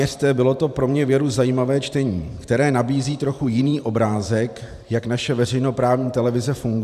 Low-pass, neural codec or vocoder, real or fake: 14.4 kHz; vocoder, 44.1 kHz, 128 mel bands every 512 samples, BigVGAN v2; fake